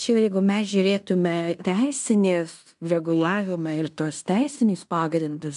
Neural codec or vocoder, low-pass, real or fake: codec, 16 kHz in and 24 kHz out, 0.9 kbps, LongCat-Audio-Codec, fine tuned four codebook decoder; 10.8 kHz; fake